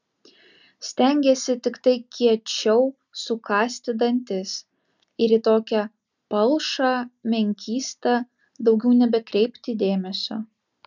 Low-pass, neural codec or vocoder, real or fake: 7.2 kHz; none; real